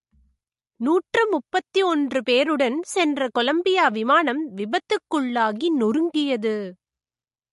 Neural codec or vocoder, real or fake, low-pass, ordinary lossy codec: none; real; 14.4 kHz; MP3, 48 kbps